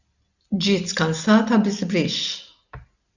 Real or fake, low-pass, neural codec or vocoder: real; 7.2 kHz; none